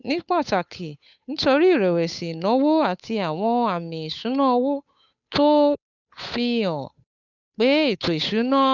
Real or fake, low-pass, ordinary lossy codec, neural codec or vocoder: fake; 7.2 kHz; none; codec, 16 kHz, 8 kbps, FunCodec, trained on Chinese and English, 25 frames a second